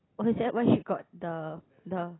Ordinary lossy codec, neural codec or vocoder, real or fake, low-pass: AAC, 16 kbps; none; real; 7.2 kHz